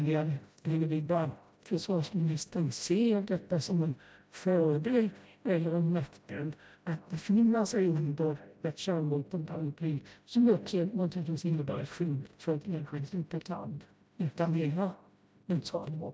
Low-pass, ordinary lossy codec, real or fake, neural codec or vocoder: none; none; fake; codec, 16 kHz, 0.5 kbps, FreqCodec, smaller model